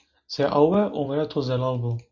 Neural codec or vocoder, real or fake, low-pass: none; real; 7.2 kHz